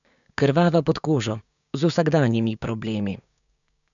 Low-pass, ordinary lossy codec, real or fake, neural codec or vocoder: 7.2 kHz; MP3, 96 kbps; fake; codec, 16 kHz, 6 kbps, DAC